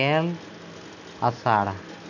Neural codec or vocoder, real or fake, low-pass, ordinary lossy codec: none; real; 7.2 kHz; none